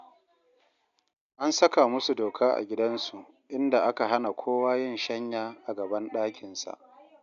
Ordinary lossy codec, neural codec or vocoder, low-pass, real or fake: none; none; 7.2 kHz; real